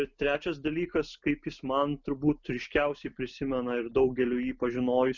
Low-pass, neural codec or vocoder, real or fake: 7.2 kHz; none; real